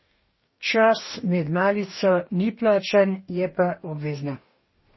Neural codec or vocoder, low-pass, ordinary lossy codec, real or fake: codec, 44.1 kHz, 2.6 kbps, DAC; 7.2 kHz; MP3, 24 kbps; fake